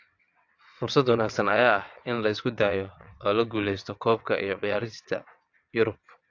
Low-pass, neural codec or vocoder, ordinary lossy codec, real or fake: 7.2 kHz; vocoder, 22.05 kHz, 80 mel bands, WaveNeXt; AAC, 48 kbps; fake